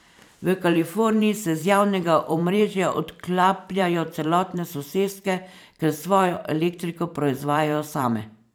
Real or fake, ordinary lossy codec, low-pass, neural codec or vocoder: fake; none; none; vocoder, 44.1 kHz, 128 mel bands every 512 samples, BigVGAN v2